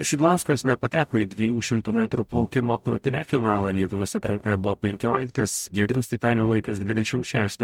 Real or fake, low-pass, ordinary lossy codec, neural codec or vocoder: fake; 19.8 kHz; MP3, 96 kbps; codec, 44.1 kHz, 0.9 kbps, DAC